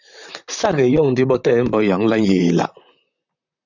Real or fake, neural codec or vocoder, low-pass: fake; vocoder, 44.1 kHz, 128 mel bands, Pupu-Vocoder; 7.2 kHz